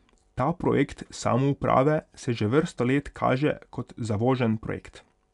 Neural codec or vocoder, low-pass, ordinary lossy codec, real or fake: none; 10.8 kHz; none; real